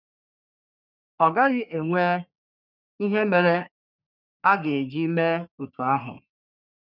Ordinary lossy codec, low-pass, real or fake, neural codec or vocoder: none; 5.4 kHz; fake; codec, 44.1 kHz, 3.4 kbps, Pupu-Codec